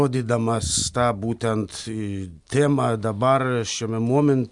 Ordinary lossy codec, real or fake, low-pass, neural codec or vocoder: Opus, 64 kbps; real; 10.8 kHz; none